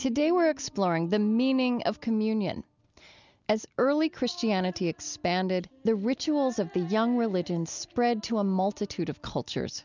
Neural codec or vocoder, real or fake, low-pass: none; real; 7.2 kHz